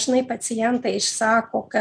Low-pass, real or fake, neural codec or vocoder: 9.9 kHz; real; none